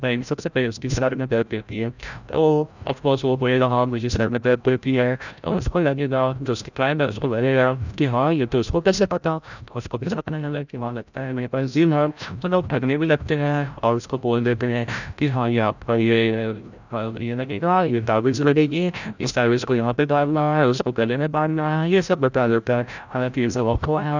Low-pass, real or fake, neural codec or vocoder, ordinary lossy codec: 7.2 kHz; fake; codec, 16 kHz, 0.5 kbps, FreqCodec, larger model; none